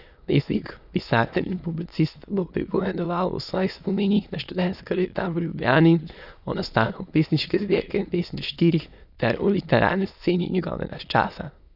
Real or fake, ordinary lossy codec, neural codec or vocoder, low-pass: fake; none; autoencoder, 22.05 kHz, a latent of 192 numbers a frame, VITS, trained on many speakers; 5.4 kHz